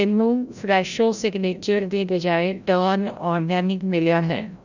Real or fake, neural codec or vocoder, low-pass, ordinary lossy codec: fake; codec, 16 kHz, 0.5 kbps, FreqCodec, larger model; 7.2 kHz; none